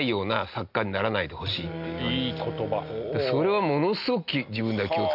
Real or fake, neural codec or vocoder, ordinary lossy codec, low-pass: real; none; none; 5.4 kHz